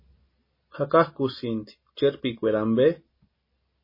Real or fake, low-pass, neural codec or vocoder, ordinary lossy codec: real; 5.4 kHz; none; MP3, 24 kbps